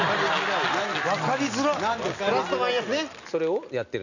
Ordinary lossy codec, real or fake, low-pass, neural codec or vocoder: none; real; 7.2 kHz; none